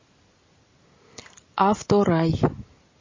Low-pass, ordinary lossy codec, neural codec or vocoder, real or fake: 7.2 kHz; MP3, 32 kbps; none; real